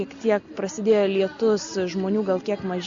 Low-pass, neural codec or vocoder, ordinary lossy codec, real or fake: 7.2 kHz; none; Opus, 64 kbps; real